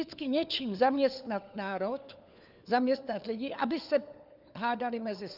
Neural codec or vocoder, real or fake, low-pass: codec, 16 kHz, 4 kbps, FunCodec, trained on LibriTTS, 50 frames a second; fake; 5.4 kHz